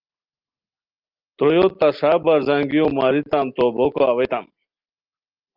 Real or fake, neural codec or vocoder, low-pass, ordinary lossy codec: real; none; 5.4 kHz; Opus, 32 kbps